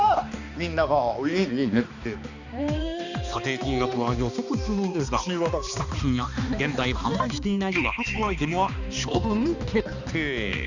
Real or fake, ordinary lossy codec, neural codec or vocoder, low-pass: fake; none; codec, 16 kHz, 2 kbps, X-Codec, HuBERT features, trained on balanced general audio; 7.2 kHz